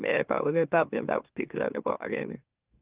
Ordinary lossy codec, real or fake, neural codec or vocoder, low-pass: Opus, 24 kbps; fake; autoencoder, 44.1 kHz, a latent of 192 numbers a frame, MeloTTS; 3.6 kHz